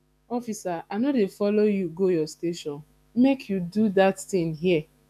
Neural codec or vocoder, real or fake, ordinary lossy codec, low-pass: autoencoder, 48 kHz, 128 numbers a frame, DAC-VAE, trained on Japanese speech; fake; none; 14.4 kHz